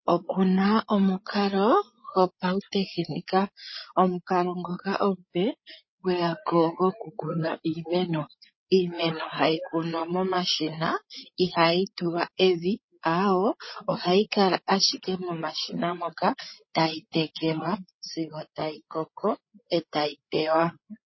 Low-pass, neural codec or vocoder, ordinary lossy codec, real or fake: 7.2 kHz; codec, 16 kHz, 8 kbps, FreqCodec, larger model; MP3, 24 kbps; fake